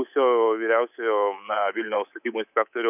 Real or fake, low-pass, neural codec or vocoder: fake; 3.6 kHz; codec, 24 kHz, 3.1 kbps, DualCodec